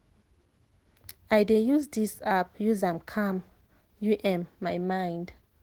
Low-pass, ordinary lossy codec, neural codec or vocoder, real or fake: 19.8 kHz; Opus, 16 kbps; autoencoder, 48 kHz, 128 numbers a frame, DAC-VAE, trained on Japanese speech; fake